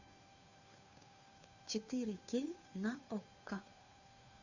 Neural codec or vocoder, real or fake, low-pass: codec, 16 kHz, 2 kbps, FunCodec, trained on Chinese and English, 25 frames a second; fake; 7.2 kHz